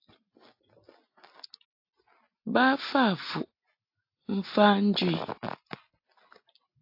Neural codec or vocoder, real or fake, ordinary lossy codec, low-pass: none; real; AAC, 32 kbps; 5.4 kHz